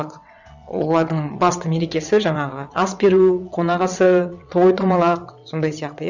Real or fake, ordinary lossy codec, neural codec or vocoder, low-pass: fake; none; vocoder, 22.05 kHz, 80 mel bands, WaveNeXt; 7.2 kHz